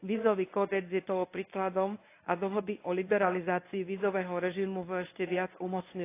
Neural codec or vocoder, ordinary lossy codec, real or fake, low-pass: codec, 24 kHz, 0.9 kbps, WavTokenizer, medium speech release version 1; AAC, 24 kbps; fake; 3.6 kHz